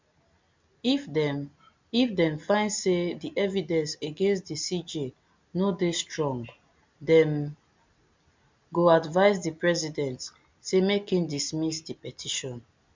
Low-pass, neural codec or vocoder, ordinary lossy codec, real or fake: 7.2 kHz; none; MP3, 64 kbps; real